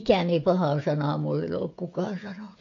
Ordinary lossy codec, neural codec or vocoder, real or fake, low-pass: MP3, 48 kbps; codec, 16 kHz, 16 kbps, FreqCodec, smaller model; fake; 7.2 kHz